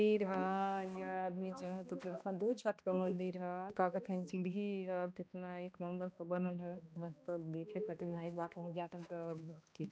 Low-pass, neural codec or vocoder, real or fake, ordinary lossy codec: none; codec, 16 kHz, 1 kbps, X-Codec, HuBERT features, trained on balanced general audio; fake; none